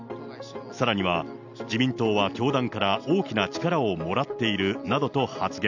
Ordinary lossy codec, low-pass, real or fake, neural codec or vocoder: none; 7.2 kHz; real; none